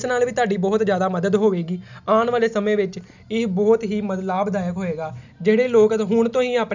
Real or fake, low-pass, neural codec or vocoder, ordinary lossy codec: real; 7.2 kHz; none; none